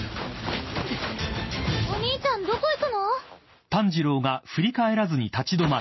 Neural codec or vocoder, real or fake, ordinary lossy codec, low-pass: none; real; MP3, 24 kbps; 7.2 kHz